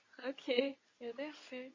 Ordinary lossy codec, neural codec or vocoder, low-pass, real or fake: MP3, 32 kbps; codec, 24 kHz, 0.9 kbps, WavTokenizer, medium speech release version 2; 7.2 kHz; fake